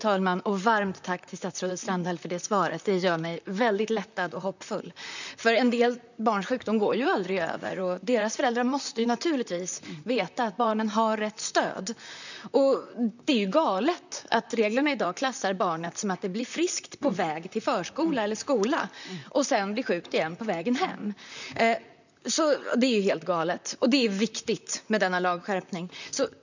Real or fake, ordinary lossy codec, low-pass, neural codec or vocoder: fake; none; 7.2 kHz; vocoder, 44.1 kHz, 128 mel bands, Pupu-Vocoder